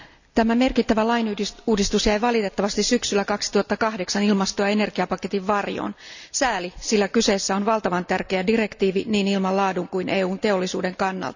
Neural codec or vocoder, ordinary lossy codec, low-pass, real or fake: none; none; 7.2 kHz; real